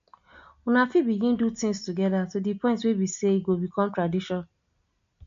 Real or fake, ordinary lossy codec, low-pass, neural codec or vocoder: real; MP3, 48 kbps; 7.2 kHz; none